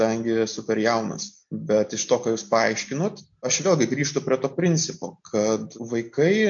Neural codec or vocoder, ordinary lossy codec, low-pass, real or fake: none; MP3, 48 kbps; 7.2 kHz; real